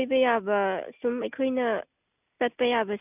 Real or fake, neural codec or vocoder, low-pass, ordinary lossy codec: real; none; 3.6 kHz; none